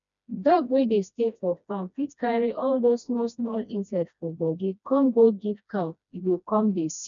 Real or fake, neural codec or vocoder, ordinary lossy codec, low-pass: fake; codec, 16 kHz, 1 kbps, FreqCodec, smaller model; none; 7.2 kHz